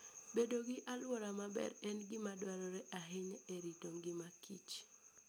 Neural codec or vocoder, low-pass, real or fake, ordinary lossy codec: none; none; real; none